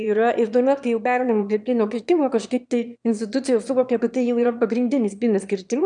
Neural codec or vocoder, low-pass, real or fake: autoencoder, 22.05 kHz, a latent of 192 numbers a frame, VITS, trained on one speaker; 9.9 kHz; fake